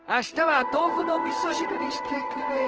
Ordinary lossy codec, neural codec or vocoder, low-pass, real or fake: Opus, 16 kbps; codec, 16 kHz in and 24 kHz out, 1 kbps, XY-Tokenizer; 7.2 kHz; fake